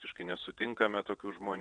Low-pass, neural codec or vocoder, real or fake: 9.9 kHz; vocoder, 22.05 kHz, 80 mel bands, WaveNeXt; fake